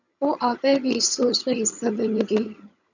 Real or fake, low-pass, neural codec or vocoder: fake; 7.2 kHz; vocoder, 22.05 kHz, 80 mel bands, HiFi-GAN